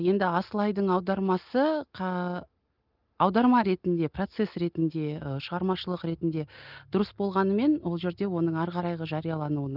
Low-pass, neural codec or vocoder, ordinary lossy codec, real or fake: 5.4 kHz; none; Opus, 24 kbps; real